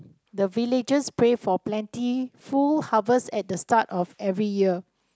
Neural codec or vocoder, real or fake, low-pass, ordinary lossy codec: none; real; none; none